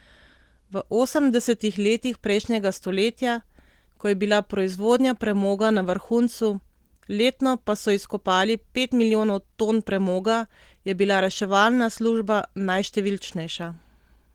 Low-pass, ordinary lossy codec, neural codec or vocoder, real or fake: 19.8 kHz; Opus, 16 kbps; none; real